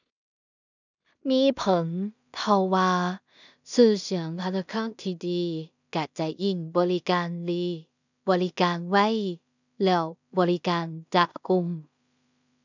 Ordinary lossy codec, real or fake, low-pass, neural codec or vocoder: none; fake; 7.2 kHz; codec, 16 kHz in and 24 kHz out, 0.4 kbps, LongCat-Audio-Codec, two codebook decoder